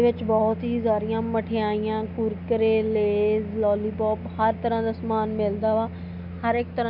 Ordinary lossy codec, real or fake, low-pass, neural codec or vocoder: none; real; 5.4 kHz; none